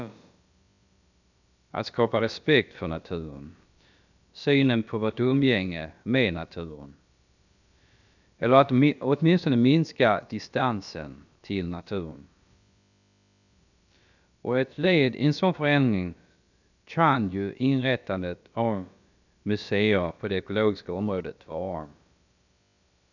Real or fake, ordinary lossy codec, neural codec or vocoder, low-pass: fake; none; codec, 16 kHz, about 1 kbps, DyCAST, with the encoder's durations; 7.2 kHz